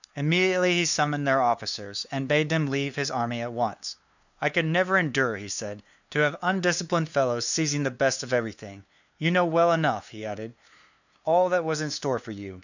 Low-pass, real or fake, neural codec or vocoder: 7.2 kHz; fake; codec, 16 kHz, 2 kbps, FunCodec, trained on LibriTTS, 25 frames a second